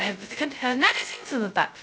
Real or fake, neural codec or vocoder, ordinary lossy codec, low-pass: fake; codec, 16 kHz, 0.2 kbps, FocalCodec; none; none